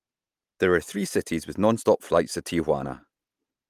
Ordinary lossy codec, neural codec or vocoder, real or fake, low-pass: Opus, 32 kbps; none; real; 14.4 kHz